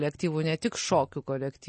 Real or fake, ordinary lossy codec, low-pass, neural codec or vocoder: real; MP3, 32 kbps; 10.8 kHz; none